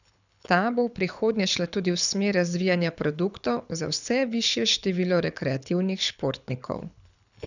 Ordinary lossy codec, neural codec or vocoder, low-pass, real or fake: none; codec, 24 kHz, 6 kbps, HILCodec; 7.2 kHz; fake